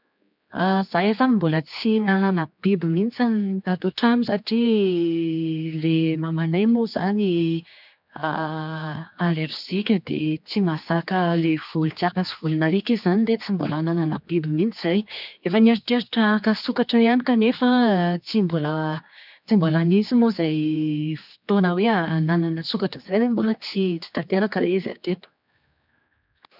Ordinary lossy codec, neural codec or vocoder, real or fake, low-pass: none; codec, 16 kHz, 4 kbps, X-Codec, HuBERT features, trained on general audio; fake; 5.4 kHz